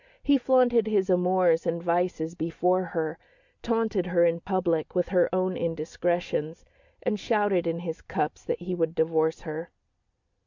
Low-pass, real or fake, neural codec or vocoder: 7.2 kHz; real; none